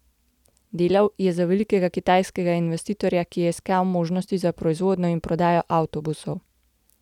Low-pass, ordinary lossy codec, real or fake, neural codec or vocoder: 19.8 kHz; none; real; none